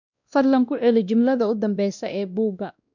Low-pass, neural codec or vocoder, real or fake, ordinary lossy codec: 7.2 kHz; codec, 16 kHz, 1 kbps, X-Codec, WavLM features, trained on Multilingual LibriSpeech; fake; none